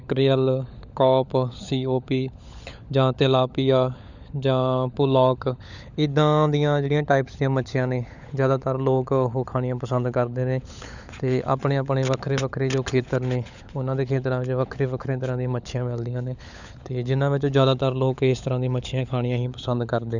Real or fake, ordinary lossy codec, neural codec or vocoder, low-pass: fake; none; codec, 16 kHz, 16 kbps, FunCodec, trained on LibriTTS, 50 frames a second; 7.2 kHz